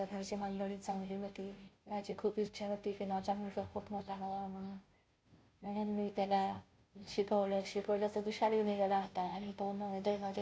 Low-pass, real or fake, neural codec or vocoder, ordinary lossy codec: none; fake; codec, 16 kHz, 0.5 kbps, FunCodec, trained on Chinese and English, 25 frames a second; none